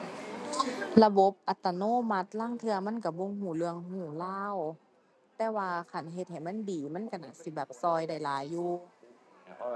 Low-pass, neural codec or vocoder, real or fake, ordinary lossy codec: none; none; real; none